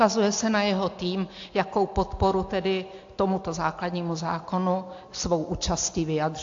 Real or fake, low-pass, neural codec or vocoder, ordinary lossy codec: real; 7.2 kHz; none; AAC, 48 kbps